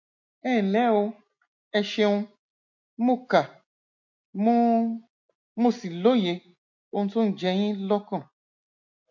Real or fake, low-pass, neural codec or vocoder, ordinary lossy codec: real; 7.2 kHz; none; MP3, 48 kbps